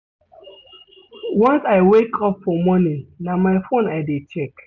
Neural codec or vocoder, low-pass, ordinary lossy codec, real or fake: none; 7.2 kHz; none; real